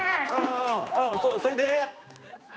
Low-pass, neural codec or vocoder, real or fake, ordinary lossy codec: none; codec, 16 kHz, 2 kbps, X-Codec, HuBERT features, trained on general audio; fake; none